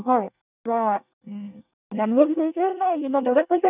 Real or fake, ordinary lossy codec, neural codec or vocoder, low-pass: fake; none; codec, 24 kHz, 1 kbps, SNAC; 3.6 kHz